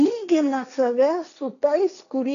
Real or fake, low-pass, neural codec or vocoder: fake; 7.2 kHz; codec, 16 kHz, 1.1 kbps, Voila-Tokenizer